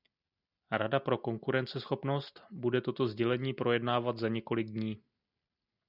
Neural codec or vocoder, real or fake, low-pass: none; real; 5.4 kHz